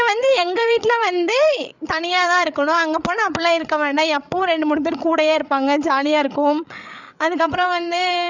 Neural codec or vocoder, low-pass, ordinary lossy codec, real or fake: codec, 16 kHz, 8 kbps, FreqCodec, larger model; 7.2 kHz; none; fake